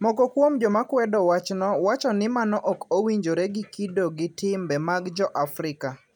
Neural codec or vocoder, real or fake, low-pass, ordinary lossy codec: none; real; 19.8 kHz; none